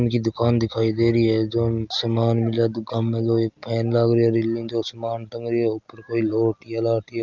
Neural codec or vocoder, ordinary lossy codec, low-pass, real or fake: none; Opus, 24 kbps; 7.2 kHz; real